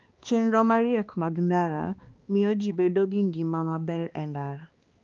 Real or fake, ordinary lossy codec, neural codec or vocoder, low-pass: fake; Opus, 32 kbps; codec, 16 kHz, 2 kbps, X-Codec, HuBERT features, trained on balanced general audio; 7.2 kHz